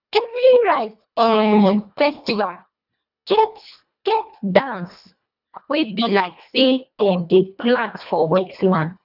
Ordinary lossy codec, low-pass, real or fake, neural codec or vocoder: none; 5.4 kHz; fake; codec, 24 kHz, 1.5 kbps, HILCodec